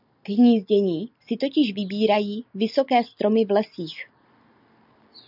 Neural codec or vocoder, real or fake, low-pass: none; real; 5.4 kHz